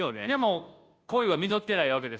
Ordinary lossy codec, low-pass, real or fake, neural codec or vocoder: none; none; fake; codec, 16 kHz, 0.5 kbps, FunCodec, trained on Chinese and English, 25 frames a second